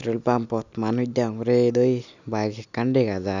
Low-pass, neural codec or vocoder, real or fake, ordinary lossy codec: 7.2 kHz; none; real; none